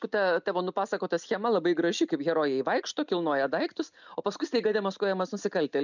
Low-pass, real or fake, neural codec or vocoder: 7.2 kHz; real; none